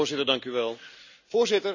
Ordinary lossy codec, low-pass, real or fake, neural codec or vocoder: none; 7.2 kHz; real; none